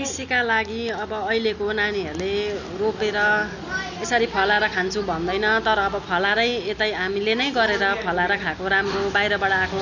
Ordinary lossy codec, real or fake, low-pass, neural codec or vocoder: none; real; 7.2 kHz; none